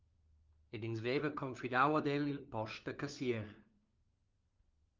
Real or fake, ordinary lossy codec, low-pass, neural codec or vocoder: fake; Opus, 24 kbps; 7.2 kHz; codec, 16 kHz, 4 kbps, FunCodec, trained on LibriTTS, 50 frames a second